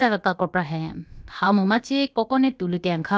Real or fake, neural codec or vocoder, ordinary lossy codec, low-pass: fake; codec, 16 kHz, about 1 kbps, DyCAST, with the encoder's durations; none; none